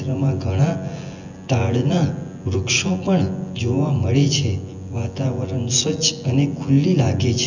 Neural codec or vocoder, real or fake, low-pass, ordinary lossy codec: vocoder, 24 kHz, 100 mel bands, Vocos; fake; 7.2 kHz; none